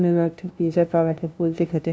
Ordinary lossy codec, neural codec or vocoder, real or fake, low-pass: none; codec, 16 kHz, 0.5 kbps, FunCodec, trained on LibriTTS, 25 frames a second; fake; none